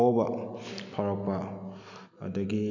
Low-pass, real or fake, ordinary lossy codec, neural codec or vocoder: 7.2 kHz; real; none; none